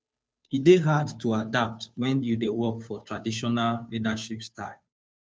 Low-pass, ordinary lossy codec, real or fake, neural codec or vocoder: none; none; fake; codec, 16 kHz, 2 kbps, FunCodec, trained on Chinese and English, 25 frames a second